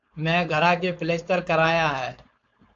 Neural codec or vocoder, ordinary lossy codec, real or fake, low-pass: codec, 16 kHz, 4.8 kbps, FACodec; AAC, 48 kbps; fake; 7.2 kHz